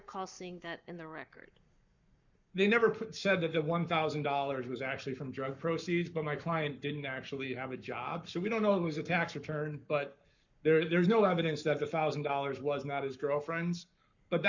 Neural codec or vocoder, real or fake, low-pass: codec, 44.1 kHz, 7.8 kbps, Pupu-Codec; fake; 7.2 kHz